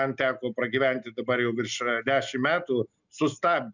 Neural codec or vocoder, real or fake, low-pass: none; real; 7.2 kHz